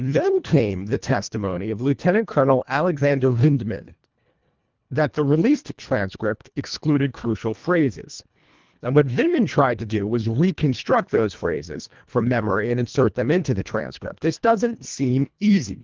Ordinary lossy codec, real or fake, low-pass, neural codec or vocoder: Opus, 32 kbps; fake; 7.2 kHz; codec, 24 kHz, 1.5 kbps, HILCodec